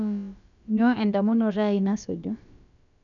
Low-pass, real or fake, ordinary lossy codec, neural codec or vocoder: 7.2 kHz; fake; none; codec, 16 kHz, about 1 kbps, DyCAST, with the encoder's durations